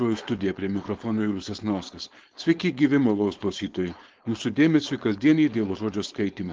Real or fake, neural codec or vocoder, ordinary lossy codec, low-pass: fake; codec, 16 kHz, 4.8 kbps, FACodec; Opus, 16 kbps; 7.2 kHz